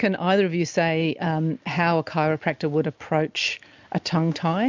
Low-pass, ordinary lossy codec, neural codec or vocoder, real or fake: 7.2 kHz; MP3, 64 kbps; none; real